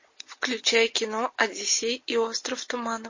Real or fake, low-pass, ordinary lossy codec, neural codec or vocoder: real; 7.2 kHz; MP3, 32 kbps; none